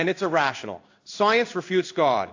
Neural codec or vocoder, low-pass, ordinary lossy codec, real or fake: codec, 16 kHz in and 24 kHz out, 1 kbps, XY-Tokenizer; 7.2 kHz; AAC, 48 kbps; fake